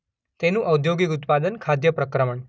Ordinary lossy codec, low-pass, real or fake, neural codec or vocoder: none; none; real; none